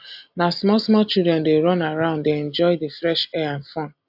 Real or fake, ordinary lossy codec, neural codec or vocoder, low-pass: real; AAC, 48 kbps; none; 5.4 kHz